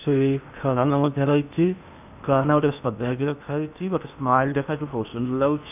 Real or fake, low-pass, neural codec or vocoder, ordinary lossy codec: fake; 3.6 kHz; codec, 16 kHz in and 24 kHz out, 0.8 kbps, FocalCodec, streaming, 65536 codes; none